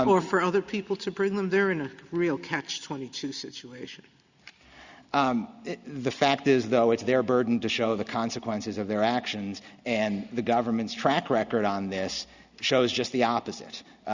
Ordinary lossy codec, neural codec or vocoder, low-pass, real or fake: Opus, 64 kbps; none; 7.2 kHz; real